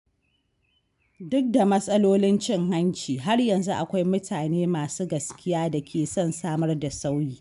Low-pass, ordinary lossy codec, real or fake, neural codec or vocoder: 10.8 kHz; MP3, 96 kbps; real; none